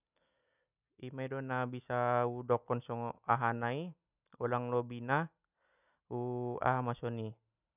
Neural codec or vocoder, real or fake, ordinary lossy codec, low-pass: none; real; none; 3.6 kHz